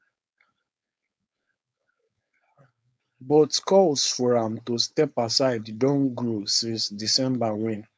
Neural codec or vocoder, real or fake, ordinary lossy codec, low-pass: codec, 16 kHz, 4.8 kbps, FACodec; fake; none; none